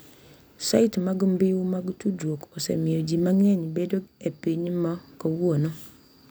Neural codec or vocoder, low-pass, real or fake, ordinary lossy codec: none; none; real; none